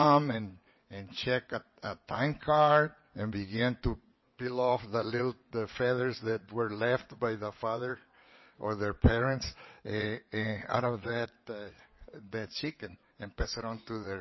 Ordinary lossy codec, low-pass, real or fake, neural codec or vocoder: MP3, 24 kbps; 7.2 kHz; fake; vocoder, 22.05 kHz, 80 mel bands, WaveNeXt